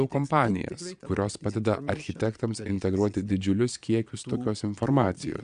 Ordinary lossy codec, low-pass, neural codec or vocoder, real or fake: AAC, 96 kbps; 9.9 kHz; vocoder, 22.05 kHz, 80 mel bands, Vocos; fake